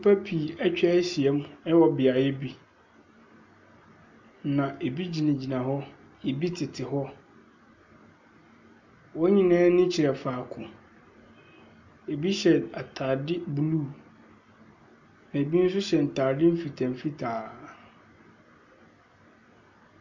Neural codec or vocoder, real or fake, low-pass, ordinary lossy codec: none; real; 7.2 kHz; MP3, 64 kbps